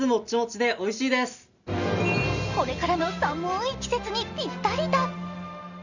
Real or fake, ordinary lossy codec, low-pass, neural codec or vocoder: real; none; 7.2 kHz; none